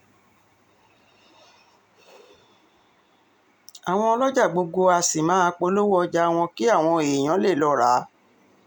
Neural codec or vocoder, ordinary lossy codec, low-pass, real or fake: none; none; 19.8 kHz; real